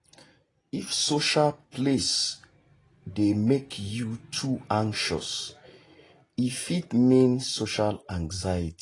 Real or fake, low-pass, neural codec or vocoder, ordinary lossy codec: real; 10.8 kHz; none; AAC, 32 kbps